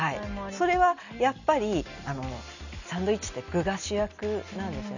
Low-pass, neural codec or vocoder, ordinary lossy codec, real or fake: 7.2 kHz; none; none; real